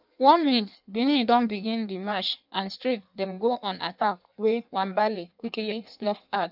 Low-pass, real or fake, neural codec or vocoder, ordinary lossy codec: 5.4 kHz; fake; codec, 16 kHz in and 24 kHz out, 1.1 kbps, FireRedTTS-2 codec; none